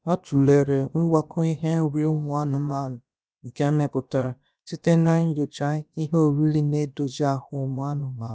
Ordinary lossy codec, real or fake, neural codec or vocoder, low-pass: none; fake; codec, 16 kHz, about 1 kbps, DyCAST, with the encoder's durations; none